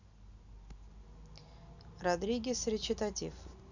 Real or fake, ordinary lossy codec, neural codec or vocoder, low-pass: real; none; none; 7.2 kHz